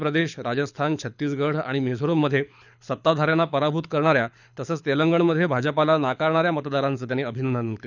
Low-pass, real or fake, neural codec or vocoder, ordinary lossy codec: 7.2 kHz; fake; codec, 24 kHz, 6 kbps, HILCodec; none